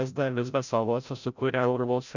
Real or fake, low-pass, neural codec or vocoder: fake; 7.2 kHz; codec, 16 kHz, 0.5 kbps, FreqCodec, larger model